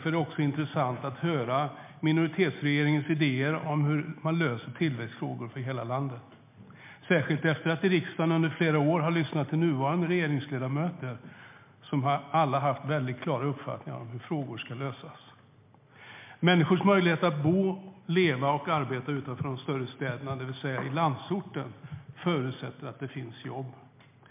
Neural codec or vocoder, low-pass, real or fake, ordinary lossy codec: none; 3.6 kHz; real; MP3, 32 kbps